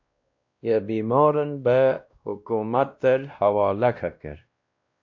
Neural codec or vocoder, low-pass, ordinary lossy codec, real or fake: codec, 16 kHz, 1 kbps, X-Codec, WavLM features, trained on Multilingual LibriSpeech; 7.2 kHz; AAC, 48 kbps; fake